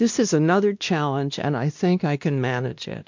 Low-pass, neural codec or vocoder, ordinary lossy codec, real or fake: 7.2 kHz; codec, 16 kHz, 1 kbps, X-Codec, WavLM features, trained on Multilingual LibriSpeech; MP3, 64 kbps; fake